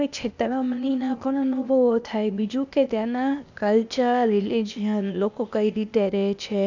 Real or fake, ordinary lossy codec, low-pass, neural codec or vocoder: fake; none; 7.2 kHz; codec, 16 kHz, 0.8 kbps, ZipCodec